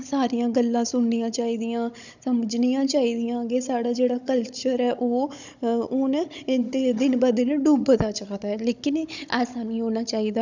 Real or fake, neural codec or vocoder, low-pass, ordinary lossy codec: fake; codec, 16 kHz, 16 kbps, FunCodec, trained on Chinese and English, 50 frames a second; 7.2 kHz; none